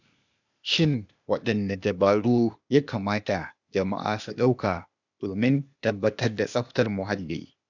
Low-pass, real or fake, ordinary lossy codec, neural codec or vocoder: 7.2 kHz; fake; none; codec, 16 kHz, 0.8 kbps, ZipCodec